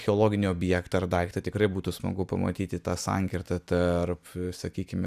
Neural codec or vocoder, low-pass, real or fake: none; 14.4 kHz; real